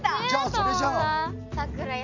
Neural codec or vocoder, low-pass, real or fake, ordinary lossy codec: none; 7.2 kHz; real; none